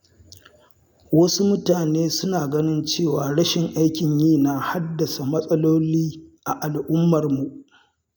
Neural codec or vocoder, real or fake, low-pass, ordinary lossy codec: none; real; none; none